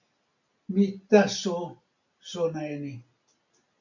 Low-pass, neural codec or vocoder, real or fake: 7.2 kHz; none; real